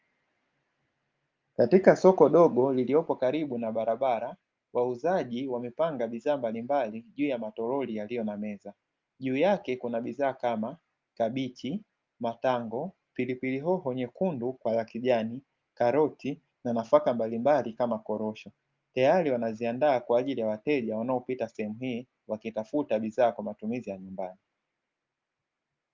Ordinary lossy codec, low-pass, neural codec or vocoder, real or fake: Opus, 24 kbps; 7.2 kHz; none; real